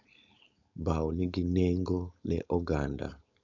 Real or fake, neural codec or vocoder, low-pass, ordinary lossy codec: fake; codec, 16 kHz, 4.8 kbps, FACodec; 7.2 kHz; none